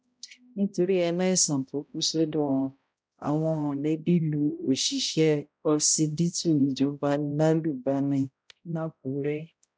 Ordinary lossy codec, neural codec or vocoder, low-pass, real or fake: none; codec, 16 kHz, 0.5 kbps, X-Codec, HuBERT features, trained on balanced general audio; none; fake